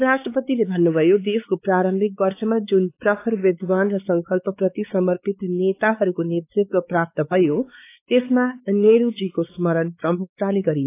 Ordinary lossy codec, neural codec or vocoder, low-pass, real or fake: AAC, 24 kbps; codec, 16 kHz, 4 kbps, X-Codec, WavLM features, trained on Multilingual LibriSpeech; 3.6 kHz; fake